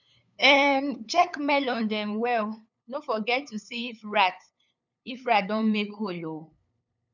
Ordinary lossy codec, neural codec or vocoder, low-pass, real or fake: none; codec, 16 kHz, 8 kbps, FunCodec, trained on LibriTTS, 25 frames a second; 7.2 kHz; fake